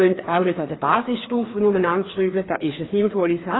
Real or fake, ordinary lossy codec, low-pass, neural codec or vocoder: fake; AAC, 16 kbps; 7.2 kHz; codec, 16 kHz, 2 kbps, FreqCodec, larger model